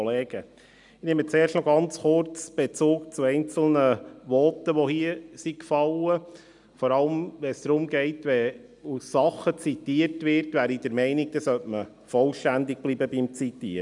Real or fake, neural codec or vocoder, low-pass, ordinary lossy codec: real; none; 10.8 kHz; none